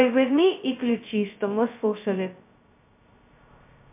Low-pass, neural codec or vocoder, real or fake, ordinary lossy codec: 3.6 kHz; codec, 16 kHz, 0.2 kbps, FocalCodec; fake; MP3, 32 kbps